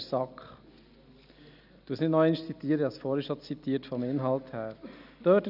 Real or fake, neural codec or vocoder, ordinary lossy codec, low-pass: real; none; none; 5.4 kHz